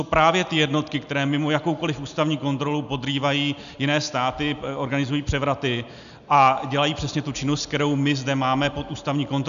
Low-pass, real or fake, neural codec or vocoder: 7.2 kHz; real; none